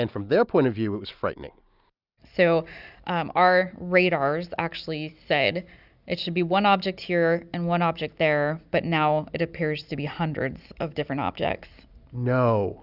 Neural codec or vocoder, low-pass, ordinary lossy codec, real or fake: none; 5.4 kHz; Opus, 64 kbps; real